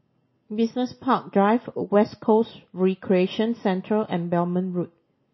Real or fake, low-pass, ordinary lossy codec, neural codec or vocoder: real; 7.2 kHz; MP3, 24 kbps; none